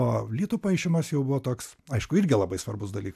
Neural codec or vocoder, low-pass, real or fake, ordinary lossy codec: none; 14.4 kHz; real; AAC, 96 kbps